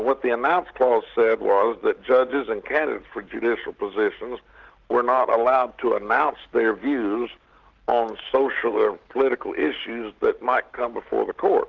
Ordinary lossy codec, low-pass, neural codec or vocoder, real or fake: Opus, 16 kbps; 7.2 kHz; none; real